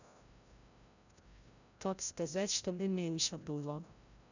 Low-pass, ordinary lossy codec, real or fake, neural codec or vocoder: 7.2 kHz; none; fake; codec, 16 kHz, 0.5 kbps, FreqCodec, larger model